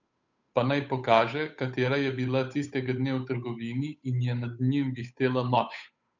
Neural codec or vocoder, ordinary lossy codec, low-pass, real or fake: codec, 16 kHz, 8 kbps, FunCodec, trained on Chinese and English, 25 frames a second; none; 7.2 kHz; fake